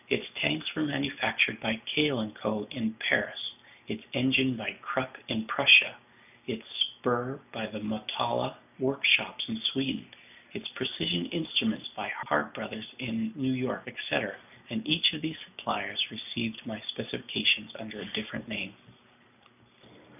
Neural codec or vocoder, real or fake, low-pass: none; real; 3.6 kHz